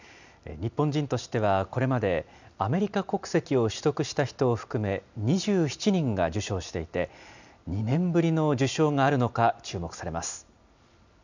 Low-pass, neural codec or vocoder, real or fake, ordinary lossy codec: 7.2 kHz; none; real; none